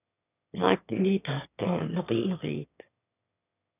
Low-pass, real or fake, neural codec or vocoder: 3.6 kHz; fake; autoencoder, 22.05 kHz, a latent of 192 numbers a frame, VITS, trained on one speaker